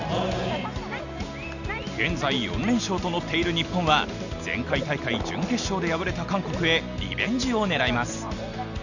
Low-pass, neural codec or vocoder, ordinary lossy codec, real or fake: 7.2 kHz; none; none; real